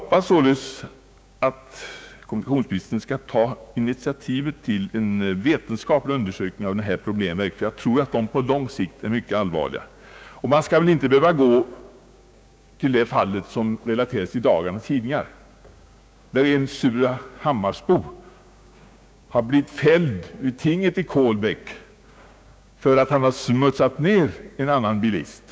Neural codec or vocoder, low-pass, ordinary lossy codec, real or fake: codec, 16 kHz, 6 kbps, DAC; none; none; fake